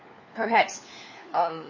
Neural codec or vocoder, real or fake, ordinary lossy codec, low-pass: codec, 16 kHz, 4 kbps, FunCodec, trained on LibriTTS, 50 frames a second; fake; MP3, 32 kbps; 7.2 kHz